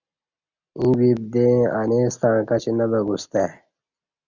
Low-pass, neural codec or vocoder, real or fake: 7.2 kHz; none; real